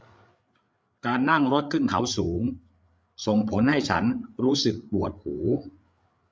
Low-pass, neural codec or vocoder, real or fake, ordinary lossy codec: none; codec, 16 kHz, 8 kbps, FreqCodec, larger model; fake; none